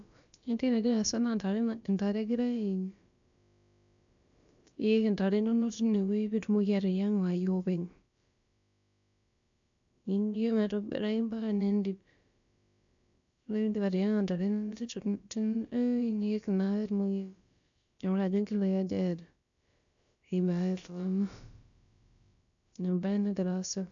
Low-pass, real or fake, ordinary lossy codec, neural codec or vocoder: 7.2 kHz; fake; AAC, 64 kbps; codec, 16 kHz, about 1 kbps, DyCAST, with the encoder's durations